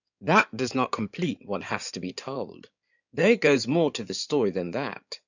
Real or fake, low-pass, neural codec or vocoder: fake; 7.2 kHz; codec, 16 kHz in and 24 kHz out, 2.2 kbps, FireRedTTS-2 codec